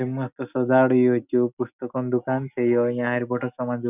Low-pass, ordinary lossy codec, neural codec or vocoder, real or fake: 3.6 kHz; none; none; real